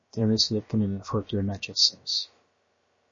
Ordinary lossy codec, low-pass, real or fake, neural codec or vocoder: MP3, 32 kbps; 7.2 kHz; fake; codec, 16 kHz, about 1 kbps, DyCAST, with the encoder's durations